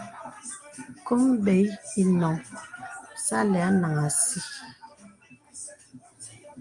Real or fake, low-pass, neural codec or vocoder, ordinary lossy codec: real; 10.8 kHz; none; Opus, 32 kbps